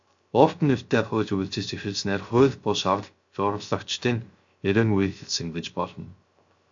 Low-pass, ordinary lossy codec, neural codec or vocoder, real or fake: 7.2 kHz; AAC, 64 kbps; codec, 16 kHz, 0.3 kbps, FocalCodec; fake